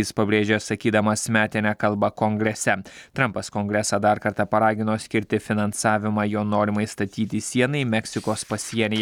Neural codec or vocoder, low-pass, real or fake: none; 19.8 kHz; real